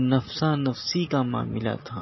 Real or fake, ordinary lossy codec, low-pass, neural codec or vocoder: real; MP3, 24 kbps; 7.2 kHz; none